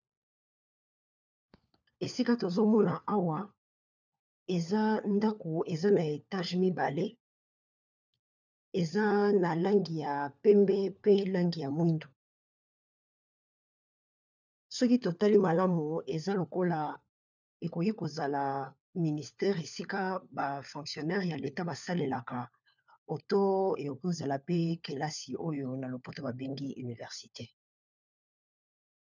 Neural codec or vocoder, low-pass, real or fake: codec, 16 kHz, 4 kbps, FunCodec, trained on LibriTTS, 50 frames a second; 7.2 kHz; fake